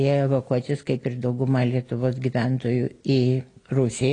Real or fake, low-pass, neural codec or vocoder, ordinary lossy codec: real; 9.9 kHz; none; AAC, 32 kbps